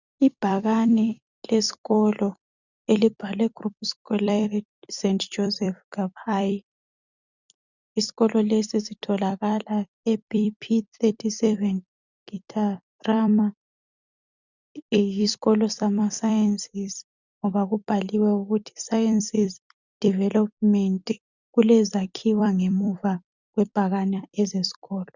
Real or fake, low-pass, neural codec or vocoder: fake; 7.2 kHz; vocoder, 44.1 kHz, 128 mel bands every 256 samples, BigVGAN v2